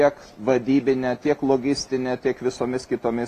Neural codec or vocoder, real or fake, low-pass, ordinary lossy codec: none; real; 14.4 kHz; AAC, 48 kbps